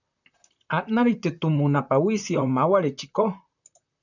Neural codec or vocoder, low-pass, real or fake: vocoder, 44.1 kHz, 128 mel bands, Pupu-Vocoder; 7.2 kHz; fake